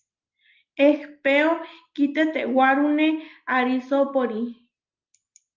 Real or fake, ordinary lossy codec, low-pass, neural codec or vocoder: real; Opus, 32 kbps; 7.2 kHz; none